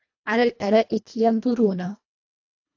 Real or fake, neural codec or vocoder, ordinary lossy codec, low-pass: fake; codec, 24 kHz, 1.5 kbps, HILCodec; AAC, 48 kbps; 7.2 kHz